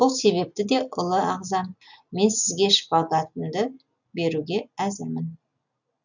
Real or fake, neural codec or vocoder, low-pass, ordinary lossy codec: real; none; 7.2 kHz; none